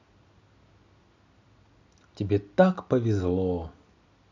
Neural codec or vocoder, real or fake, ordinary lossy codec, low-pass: none; real; none; 7.2 kHz